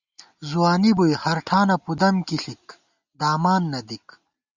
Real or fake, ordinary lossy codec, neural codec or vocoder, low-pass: real; Opus, 64 kbps; none; 7.2 kHz